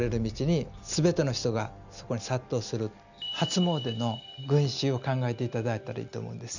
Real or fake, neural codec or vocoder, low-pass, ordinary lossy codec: real; none; 7.2 kHz; none